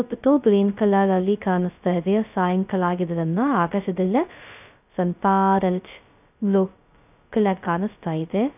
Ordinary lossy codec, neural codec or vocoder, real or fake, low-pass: none; codec, 16 kHz, 0.2 kbps, FocalCodec; fake; 3.6 kHz